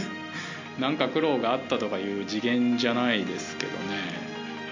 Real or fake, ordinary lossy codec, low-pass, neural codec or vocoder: real; MP3, 64 kbps; 7.2 kHz; none